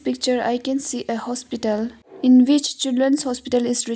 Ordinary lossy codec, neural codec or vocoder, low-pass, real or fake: none; none; none; real